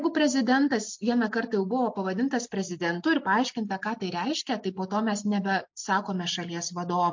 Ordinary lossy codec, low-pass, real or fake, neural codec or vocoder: MP3, 48 kbps; 7.2 kHz; real; none